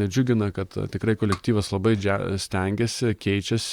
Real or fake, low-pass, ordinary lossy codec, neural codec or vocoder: fake; 19.8 kHz; Opus, 64 kbps; vocoder, 44.1 kHz, 128 mel bands, Pupu-Vocoder